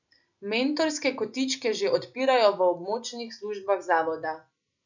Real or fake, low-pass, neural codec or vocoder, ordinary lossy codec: real; 7.2 kHz; none; none